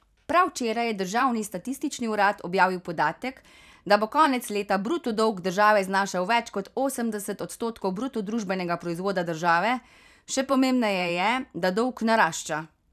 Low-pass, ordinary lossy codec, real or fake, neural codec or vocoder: 14.4 kHz; none; fake; vocoder, 44.1 kHz, 128 mel bands every 512 samples, BigVGAN v2